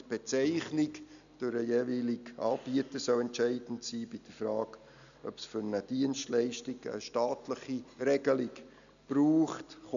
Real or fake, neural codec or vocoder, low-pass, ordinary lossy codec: real; none; 7.2 kHz; MP3, 96 kbps